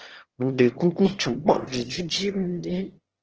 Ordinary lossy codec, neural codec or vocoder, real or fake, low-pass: Opus, 16 kbps; autoencoder, 22.05 kHz, a latent of 192 numbers a frame, VITS, trained on one speaker; fake; 7.2 kHz